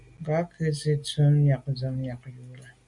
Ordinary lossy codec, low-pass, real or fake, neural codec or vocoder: MP3, 96 kbps; 10.8 kHz; real; none